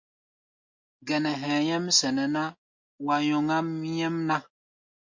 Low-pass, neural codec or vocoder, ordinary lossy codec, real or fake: 7.2 kHz; none; MP3, 48 kbps; real